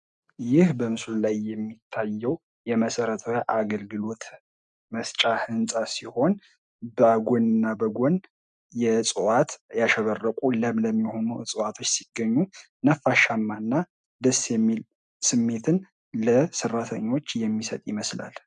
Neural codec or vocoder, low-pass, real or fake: none; 9.9 kHz; real